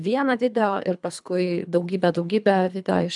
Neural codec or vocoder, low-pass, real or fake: codec, 24 kHz, 3 kbps, HILCodec; 10.8 kHz; fake